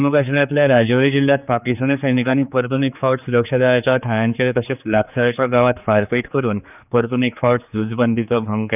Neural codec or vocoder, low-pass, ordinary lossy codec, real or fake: codec, 16 kHz, 4 kbps, X-Codec, HuBERT features, trained on general audio; 3.6 kHz; none; fake